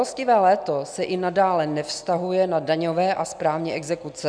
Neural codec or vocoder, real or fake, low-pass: none; real; 9.9 kHz